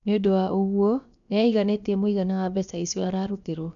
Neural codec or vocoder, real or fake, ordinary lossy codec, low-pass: codec, 16 kHz, about 1 kbps, DyCAST, with the encoder's durations; fake; none; 7.2 kHz